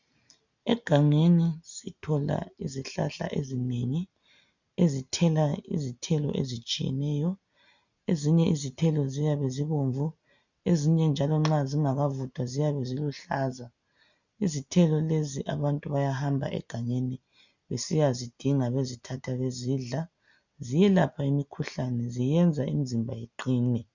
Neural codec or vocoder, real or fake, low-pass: none; real; 7.2 kHz